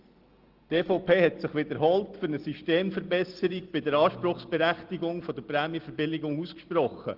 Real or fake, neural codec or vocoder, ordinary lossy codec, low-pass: real; none; Opus, 16 kbps; 5.4 kHz